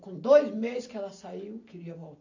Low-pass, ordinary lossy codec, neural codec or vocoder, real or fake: 7.2 kHz; AAC, 48 kbps; none; real